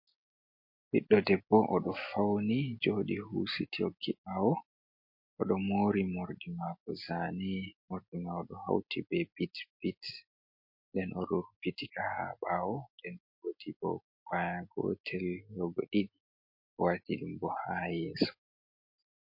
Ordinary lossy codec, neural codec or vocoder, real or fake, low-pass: MP3, 32 kbps; none; real; 5.4 kHz